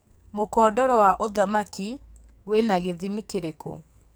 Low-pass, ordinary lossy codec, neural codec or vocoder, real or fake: none; none; codec, 44.1 kHz, 2.6 kbps, SNAC; fake